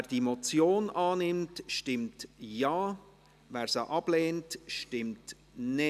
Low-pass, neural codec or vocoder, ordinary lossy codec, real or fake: 14.4 kHz; none; none; real